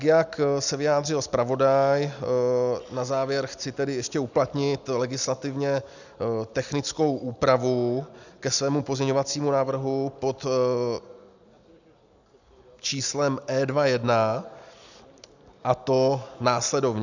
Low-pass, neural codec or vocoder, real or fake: 7.2 kHz; none; real